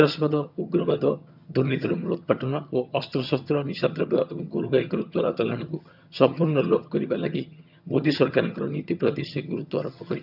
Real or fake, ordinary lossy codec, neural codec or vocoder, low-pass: fake; none; vocoder, 22.05 kHz, 80 mel bands, HiFi-GAN; 5.4 kHz